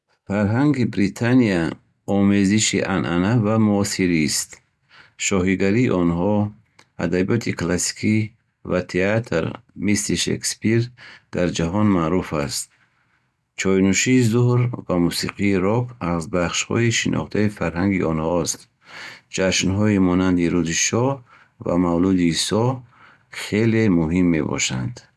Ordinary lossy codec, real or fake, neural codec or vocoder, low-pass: none; real; none; none